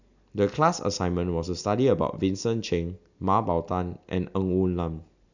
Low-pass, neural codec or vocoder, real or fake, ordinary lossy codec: 7.2 kHz; none; real; none